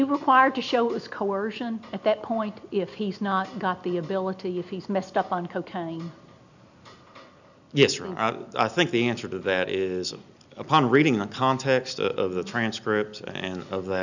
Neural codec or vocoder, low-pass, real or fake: none; 7.2 kHz; real